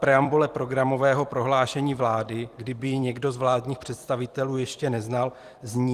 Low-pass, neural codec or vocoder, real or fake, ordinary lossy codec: 14.4 kHz; vocoder, 44.1 kHz, 128 mel bands every 256 samples, BigVGAN v2; fake; Opus, 24 kbps